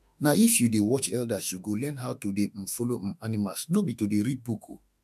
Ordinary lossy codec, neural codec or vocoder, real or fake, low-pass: MP3, 96 kbps; autoencoder, 48 kHz, 32 numbers a frame, DAC-VAE, trained on Japanese speech; fake; 14.4 kHz